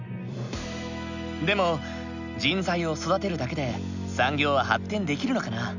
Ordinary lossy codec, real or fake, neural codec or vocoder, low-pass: none; real; none; 7.2 kHz